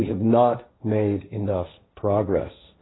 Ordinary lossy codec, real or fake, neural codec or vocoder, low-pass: AAC, 16 kbps; fake; codec, 16 kHz, 1.1 kbps, Voila-Tokenizer; 7.2 kHz